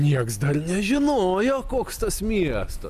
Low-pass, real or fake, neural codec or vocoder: 14.4 kHz; real; none